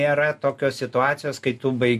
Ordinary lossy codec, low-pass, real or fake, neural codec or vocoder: MP3, 64 kbps; 14.4 kHz; real; none